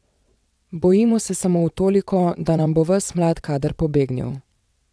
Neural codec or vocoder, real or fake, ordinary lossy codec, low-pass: vocoder, 22.05 kHz, 80 mel bands, WaveNeXt; fake; none; none